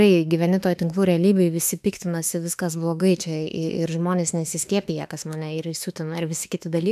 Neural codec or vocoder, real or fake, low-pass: autoencoder, 48 kHz, 32 numbers a frame, DAC-VAE, trained on Japanese speech; fake; 14.4 kHz